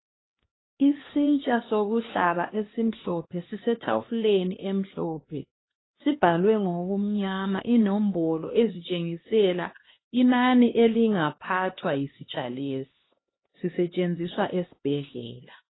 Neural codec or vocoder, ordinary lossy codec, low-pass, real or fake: codec, 16 kHz, 2 kbps, X-Codec, HuBERT features, trained on LibriSpeech; AAC, 16 kbps; 7.2 kHz; fake